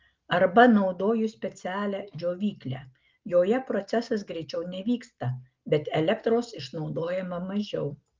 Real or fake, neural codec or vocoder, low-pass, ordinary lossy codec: real; none; 7.2 kHz; Opus, 32 kbps